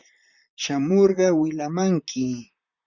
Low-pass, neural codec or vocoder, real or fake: 7.2 kHz; vocoder, 44.1 kHz, 128 mel bands, Pupu-Vocoder; fake